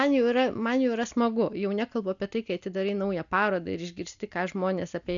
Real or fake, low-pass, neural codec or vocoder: real; 7.2 kHz; none